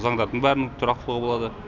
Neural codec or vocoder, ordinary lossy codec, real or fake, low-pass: none; none; real; 7.2 kHz